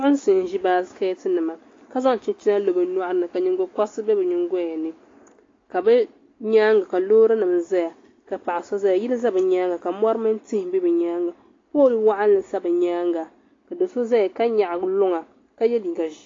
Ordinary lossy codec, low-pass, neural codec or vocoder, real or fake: AAC, 32 kbps; 7.2 kHz; none; real